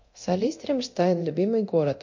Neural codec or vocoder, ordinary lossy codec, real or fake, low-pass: codec, 24 kHz, 0.9 kbps, DualCodec; MP3, 48 kbps; fake; 7.2 kHz